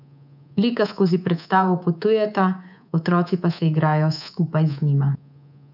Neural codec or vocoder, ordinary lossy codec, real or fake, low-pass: codec, 24 kHz, 3.1 kbps, DualCodec; none; fake; 5.4 kHz